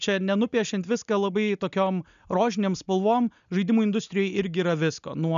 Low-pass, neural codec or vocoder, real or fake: 7.2 kHz; none; real